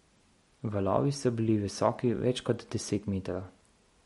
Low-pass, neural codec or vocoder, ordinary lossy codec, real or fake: 19.8 kHz; none; MP3, 48 kbps; real